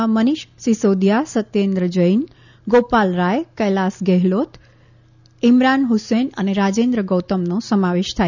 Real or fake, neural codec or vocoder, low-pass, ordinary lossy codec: real; none; 7.2 kHz; none